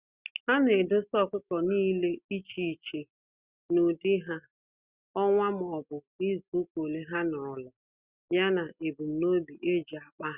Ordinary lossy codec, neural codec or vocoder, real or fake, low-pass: Opus, 64 kbps; none; real; 3.6 kHz